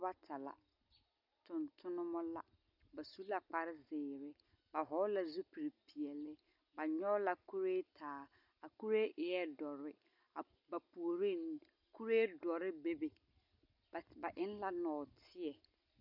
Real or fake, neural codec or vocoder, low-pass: real; none; 5.4 kHz